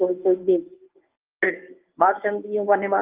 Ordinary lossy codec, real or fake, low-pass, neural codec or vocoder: Opus, 32 kbps; fake; 3.6 kHz; codec, 24 kHz, 0.9 kbps, WavTokenizer, medium speech release version 1